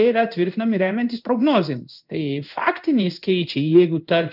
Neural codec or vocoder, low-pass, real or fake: codec, 16 kHz in and 24 kHz out, 1 kbps, XY-Tokenizer; 5.4 kHz; fake